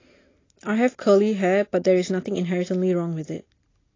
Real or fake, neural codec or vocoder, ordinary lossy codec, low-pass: real; none; AAC, 32 kbps; 7.2 kHz